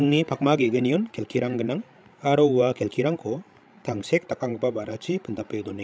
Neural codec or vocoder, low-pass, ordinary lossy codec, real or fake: codec, 16 kHz, 16 kbps, FreqCodec, larger model; none; none; fake